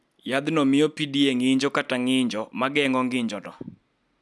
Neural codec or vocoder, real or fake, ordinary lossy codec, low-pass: none; real; none; none